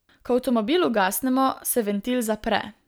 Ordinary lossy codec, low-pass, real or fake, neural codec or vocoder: none; none; real; none